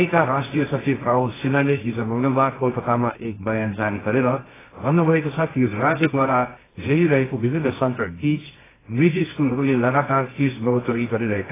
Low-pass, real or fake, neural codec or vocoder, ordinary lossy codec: 3.6 kHz; fake; codec, 24 kHz, 0.9 kbps, WavTokenizer, medium music audio release; AAC, 16 kbps